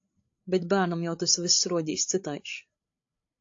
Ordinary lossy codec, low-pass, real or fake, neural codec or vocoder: AAC, 48 kbps; 7.2 kHz; fake; codec, 16 kHz, 8 kbps, FreqCodec, larger model